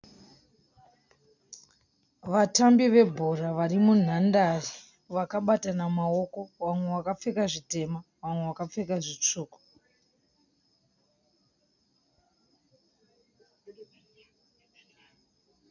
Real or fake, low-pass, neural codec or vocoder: real; 7.2 kHz; none